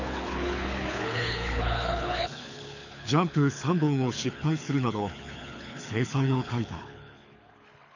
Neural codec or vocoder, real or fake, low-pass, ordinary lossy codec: codec, 24 kHz, 6 kbps, HILCodec; fake; 7.2 kHz; none